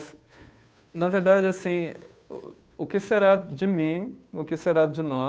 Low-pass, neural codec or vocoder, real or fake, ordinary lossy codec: none; codec, 16 kHz, 2 kbps, FunCodec, trained on Chinese and English, 25 frames a second; fake; none